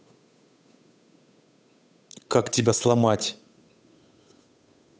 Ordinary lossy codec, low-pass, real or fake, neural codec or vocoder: none; none; fake; codec, 16 kHz, 8 kbps, FunCodec, trained on Chinese and English, 25 frames a second